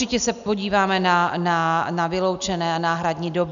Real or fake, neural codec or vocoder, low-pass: real; none; 7.2 kHz